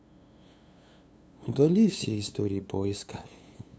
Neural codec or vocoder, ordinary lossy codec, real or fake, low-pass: codec, 16 kHz, 2 kbps, FunCodec, trained on LibriTTS, 25 frames a second; none; fake; none